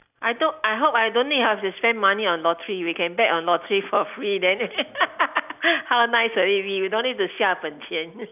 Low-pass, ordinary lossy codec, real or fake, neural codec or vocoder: 3.6 kHz; none; real; none